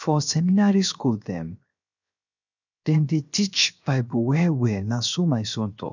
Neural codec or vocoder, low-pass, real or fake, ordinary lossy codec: codec, 16 kHz, 0.7 kbps, FocalCodec; 7.2 kHz; fake; none